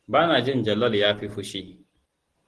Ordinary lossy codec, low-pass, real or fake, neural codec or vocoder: Opus, 16 kbps; 10.8 kHz; real; none